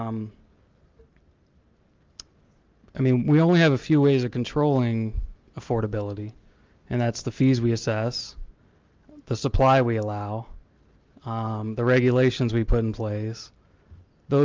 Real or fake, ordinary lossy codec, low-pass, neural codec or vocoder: real; Opus, 32 kbps; 7.2 kHz; none